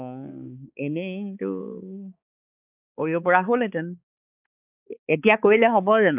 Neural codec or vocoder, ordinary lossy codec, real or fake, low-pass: codec, 16 kHz, 4 kbps, X-Codec, HuBERT features, trained on balanced general audio; none; fake; 3.6 kHz